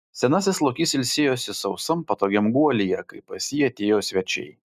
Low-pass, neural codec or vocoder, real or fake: 14.4 kHz; none; real